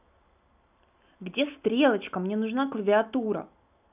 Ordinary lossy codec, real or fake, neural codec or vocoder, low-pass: none; real; none; 3.6 kHz